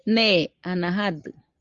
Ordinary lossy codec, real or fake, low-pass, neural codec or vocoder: Opus, 32 kbps; real; 7.2 kHz; none